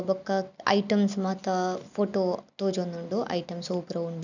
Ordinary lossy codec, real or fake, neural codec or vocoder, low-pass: none; real; none; 7.2 kHz